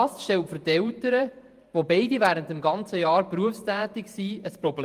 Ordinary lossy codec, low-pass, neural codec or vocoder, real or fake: Opus, 32 kbps; 14.4 kHz; vocoder, 48 kHz, 128 mel bands, Vocos; fake